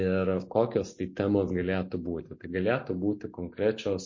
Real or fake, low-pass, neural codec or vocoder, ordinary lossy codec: real; 7.2 kHz; none; MP3, 32 kbps